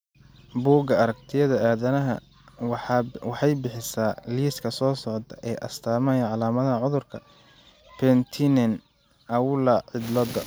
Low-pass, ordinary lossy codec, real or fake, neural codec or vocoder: none; none; real; none